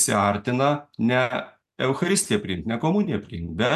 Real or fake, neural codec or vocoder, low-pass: real; none; 14.4 kHz